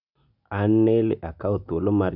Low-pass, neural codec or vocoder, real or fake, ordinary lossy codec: 5.4 kHz; none; real; none